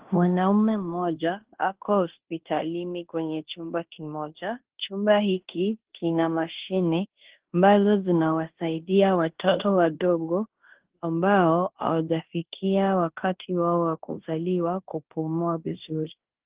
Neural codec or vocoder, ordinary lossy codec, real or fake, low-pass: codec, 16 kHz in and 24 kHz out, 0.9 kbps, LongCat-Audio-Codec, fine tuned four codebook decoder; Opus, 16 kbps; fake; 3.6 kHz